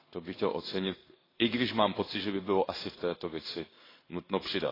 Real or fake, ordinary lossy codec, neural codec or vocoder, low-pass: fake; AAC, 24 kbps; codec, 16 kHz in and 24 kHz out, 1 kbps, XY-Tokenizer; 5.4 kHz